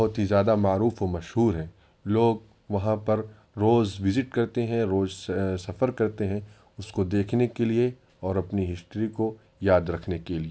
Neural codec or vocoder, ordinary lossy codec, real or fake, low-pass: none; none; real; none